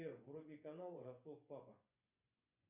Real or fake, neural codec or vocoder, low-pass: fake; vocoder, 24 kHz, 100 mel bands, Vocos; 3.6 kHz